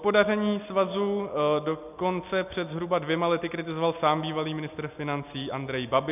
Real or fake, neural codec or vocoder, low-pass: real; none; 3.6 kHz